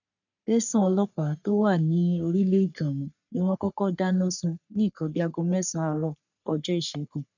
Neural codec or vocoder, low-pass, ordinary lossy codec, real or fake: codec, 44.1 kHz, 3.4 kbps, Pupu-Codec; 7.2 kHz; none; fake